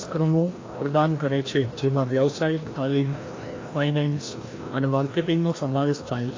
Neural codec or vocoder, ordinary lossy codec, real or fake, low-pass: codec, 16 kHz, 1 kbps, FreqCodec, larger model; AAC, 32 kbps; fake; 7.2 kHz